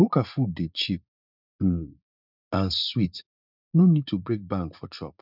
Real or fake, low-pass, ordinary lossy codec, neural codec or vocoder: fake; 5.4 kHz; none; vocoder, 44.1 kHz, 80 mel bands, Vocos